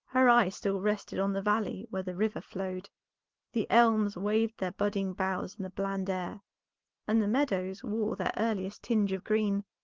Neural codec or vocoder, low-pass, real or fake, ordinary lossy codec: none; 7.2 kHz; real; Opus, 16 kbps